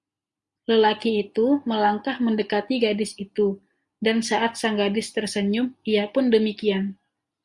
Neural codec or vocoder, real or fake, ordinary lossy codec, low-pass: none; real; Opus, 64 kbps; 10.8 kHz